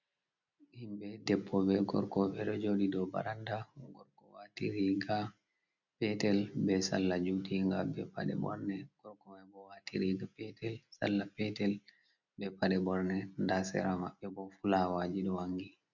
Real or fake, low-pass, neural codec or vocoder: real; 7.2 kHz; none